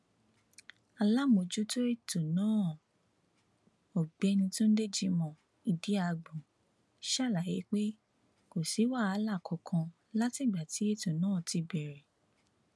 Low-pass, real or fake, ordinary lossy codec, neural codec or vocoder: none; real; none; none